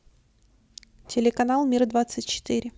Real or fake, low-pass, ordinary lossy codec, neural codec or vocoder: real; none; none; none